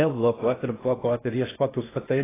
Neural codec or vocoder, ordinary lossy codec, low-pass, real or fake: codec, 16 kHz in and 24 kHz out, 0.6 kbps, FocalCodec, streaming, 4096 codes; AAC, 16 kbps; 3.6 kHz; fake